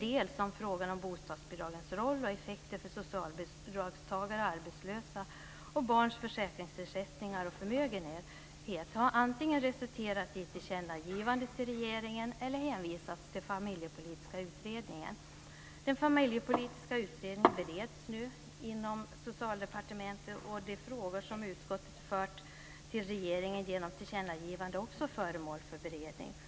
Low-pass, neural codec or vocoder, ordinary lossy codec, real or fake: none; none; none; real